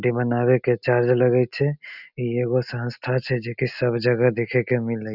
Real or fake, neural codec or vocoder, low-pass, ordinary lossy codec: real; none; 5.4 kHz; none